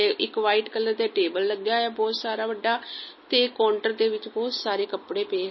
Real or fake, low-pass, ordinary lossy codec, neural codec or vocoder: real; 7.2 kHz; MP3, 24 kbps; none